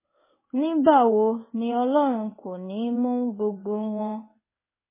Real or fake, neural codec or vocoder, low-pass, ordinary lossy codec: fake; codec, 16 kHz in and 24 kHz out, 1 kbps, XY-Tokenizer; 3.6 kHz; MP3, 16 kbps